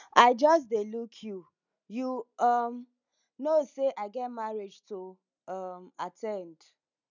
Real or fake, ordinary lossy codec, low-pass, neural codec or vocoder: real; none; 7.2 kHz; none